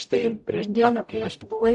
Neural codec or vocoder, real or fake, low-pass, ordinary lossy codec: codec, 44.1 kHz, 0.9 kbps, DAC; fake; 10.8 kHz; AAC, 64 kbps